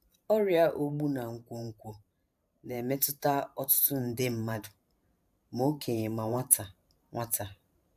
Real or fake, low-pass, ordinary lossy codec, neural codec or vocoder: fake; 14.4 kHz; AAC, 96 kbps; vocoder, 44.1 kHz, 128 mel bands every 512 samples, BigVGAN v2